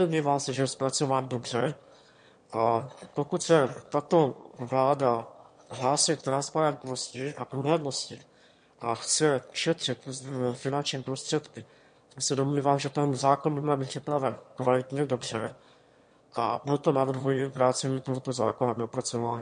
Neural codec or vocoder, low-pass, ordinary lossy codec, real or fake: autoencoder, 22.05 kHz, a latent of 192 numbers a frame, VITS, trained on one speaker; 9.9 kHz; MP3, 48 kbps; fake